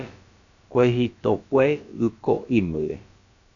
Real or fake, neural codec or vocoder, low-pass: fake; codec, 16 kHz, about 1 kbps, DyCAST, with the encoder's durations; 7.2 kHz